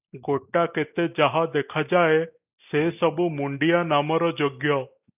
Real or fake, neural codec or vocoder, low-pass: real; none; 3.6 kHz